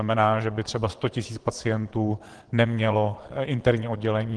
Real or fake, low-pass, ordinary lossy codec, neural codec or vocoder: fake; 9.9 kHz; Opus, 16 kbps; vocoder, 22.05 kHz, 80 mel bands, Vocos